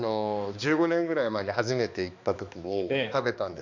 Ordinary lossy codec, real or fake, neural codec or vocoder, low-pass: MP3, 64 kbps; fake; codec, 16 kHz, 2 kbps, X-Codec, HuBERT features, trained on balanced general audio; 7.2 kHz